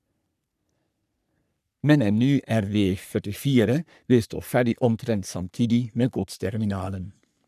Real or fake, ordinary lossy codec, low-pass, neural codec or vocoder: fake; none; 14.4 kHz; codec, 44.1 kHz, 3.4 kbps, Pupu-Codec